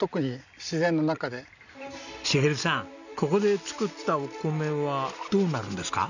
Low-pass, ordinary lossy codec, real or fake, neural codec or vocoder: 7.2 kHz; none; real; none